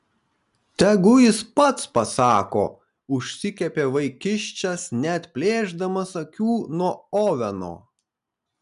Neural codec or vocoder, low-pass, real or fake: none; 10.8 kHz; real